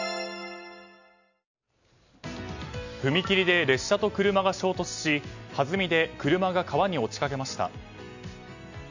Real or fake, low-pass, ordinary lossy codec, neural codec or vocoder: real; 7.2 kHz; none; none